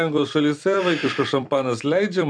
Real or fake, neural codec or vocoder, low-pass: fake; vocoder, 44.1 kHz, 128 mel bands every 256 samples, BigVGAN v2; 9.9 kHz